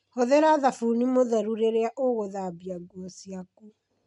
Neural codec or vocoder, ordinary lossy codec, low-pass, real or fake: none; none; 10.8 kHz; real